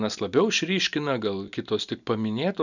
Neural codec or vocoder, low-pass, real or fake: none; 7.2 kHz; real